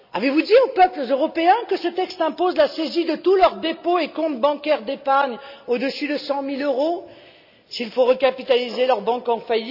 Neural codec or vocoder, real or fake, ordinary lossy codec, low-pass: none; real; none; 5.4 kHz